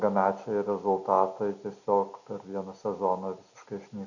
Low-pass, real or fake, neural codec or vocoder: 7.2 kHz; real; none